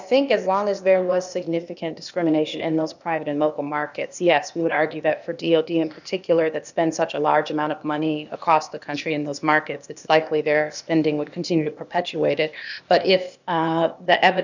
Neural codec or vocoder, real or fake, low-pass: codec, 16 kHz, 0.8 kbps, ZipCodec; fake; 7.2 kHz